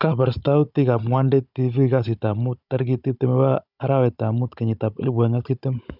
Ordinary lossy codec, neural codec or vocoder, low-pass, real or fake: none; codec, 16 kHz, 16 kbps, FunCodec, trained on Chinese and English, 50 frames a second; 5.4 kHz; fake